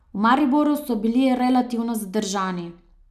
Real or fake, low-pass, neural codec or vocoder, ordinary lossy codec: real; 14.4 kHz; none; none